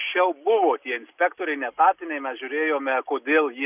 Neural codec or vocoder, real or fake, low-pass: none; real; 3.6 kHz